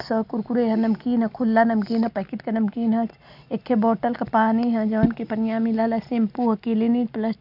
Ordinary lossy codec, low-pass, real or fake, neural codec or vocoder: none; 5.4 kHz; real; none